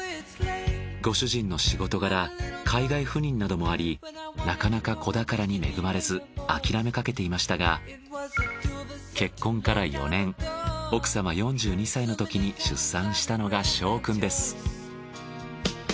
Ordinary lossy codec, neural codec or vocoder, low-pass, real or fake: none; none; none; real